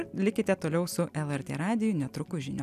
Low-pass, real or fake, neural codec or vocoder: 14.4 kHz; real; none